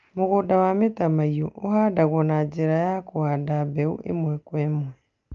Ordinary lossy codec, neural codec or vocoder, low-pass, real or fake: Opus, 24 kbps; none; 7.2 kHz; real